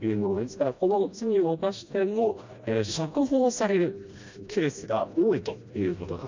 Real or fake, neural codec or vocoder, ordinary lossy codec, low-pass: fake; codec, 16 kHz, 1 kbps, FreqCodec, smaller model; MP3, 64 kbps; 7.2 kHz